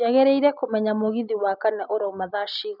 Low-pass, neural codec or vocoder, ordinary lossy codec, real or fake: 5.4 kHz; none; none; real